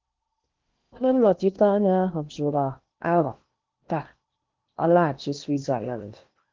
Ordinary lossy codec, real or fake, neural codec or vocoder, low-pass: Opus, 32 kbps; fake; codec, 16 kHz in and 24 kHz out, 0.8 kbps, FocalCodec, streaming, 65536 codes; 7.2 kHz